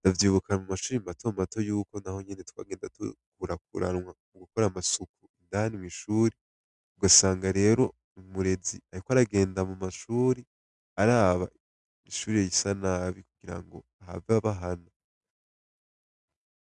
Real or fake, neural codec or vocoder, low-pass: real; none; 10.8 kHz